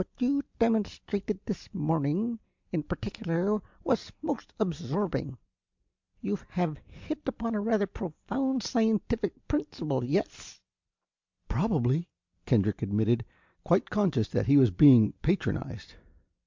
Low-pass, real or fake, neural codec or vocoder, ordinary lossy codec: 7.2 kHz; real; none; MP3, 64 kbps